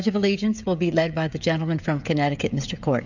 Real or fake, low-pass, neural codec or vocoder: fake; 7.2 kHz; codec, 16 kHz, 16 kbps, FreqCodec, smaller model